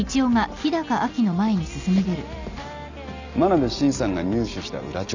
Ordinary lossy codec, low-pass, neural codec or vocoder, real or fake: none; 7.2 kHz; none; real